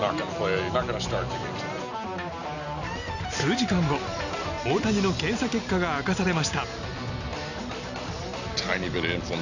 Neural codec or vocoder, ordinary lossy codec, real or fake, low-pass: none; none; real; 7.2 kHz